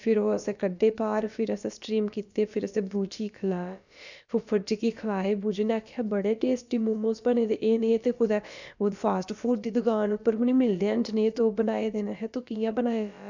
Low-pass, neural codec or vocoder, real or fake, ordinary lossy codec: 7.2 kHz; codec, 16 kHz, about 1 kbps, DyCAST, with the encoder's durations; fake; none